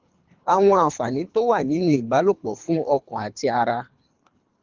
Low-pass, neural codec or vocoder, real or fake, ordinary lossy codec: 7.2 kHz; codec, 24 kHz, 3 kbps, HILCodec; fake; Opus, 24 kbps